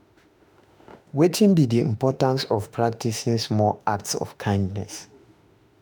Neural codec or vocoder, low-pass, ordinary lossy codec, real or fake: autoencoder, 48 kHz, 32 numbers a frame, DAC-VAE, trained on Japanese speech; none; none; fake